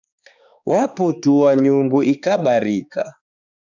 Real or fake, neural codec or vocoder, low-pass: fake; codec, 16 kHz, 2 kbps, X-Codec, HuBERT features, trained on balanced general audio; 7.2 kHz